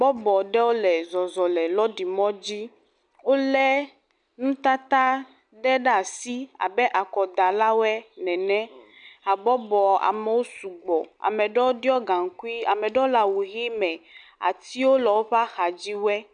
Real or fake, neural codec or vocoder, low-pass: real; none; 9.9 kHz